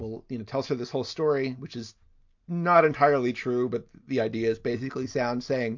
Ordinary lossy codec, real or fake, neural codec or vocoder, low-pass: MP3, 48 kbps; real; none; 7.2 kHz